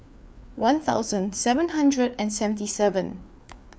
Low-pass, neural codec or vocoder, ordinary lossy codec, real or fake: none; codec, 16 kHz, 4 kbps, FreqCodec, larger model; none; fake